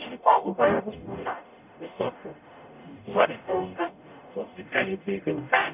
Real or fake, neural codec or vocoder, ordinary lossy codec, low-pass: fake; codec, 44.1 kHz, 0.9 kbps, DAC; none; 3.6 kHz